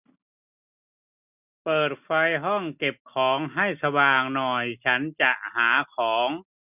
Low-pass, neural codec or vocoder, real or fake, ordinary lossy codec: 3.6 kHz; none; real; none